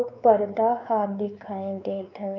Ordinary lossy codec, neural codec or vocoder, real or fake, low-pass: none; codec, 16 kHz, 4 kbps, X-Codec, WavLM features, trained on Multilingual LibriSpeech; fake; 7.2 kHz